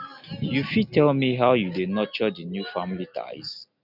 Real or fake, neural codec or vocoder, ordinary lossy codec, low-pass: real; none; none; 5.4 kHz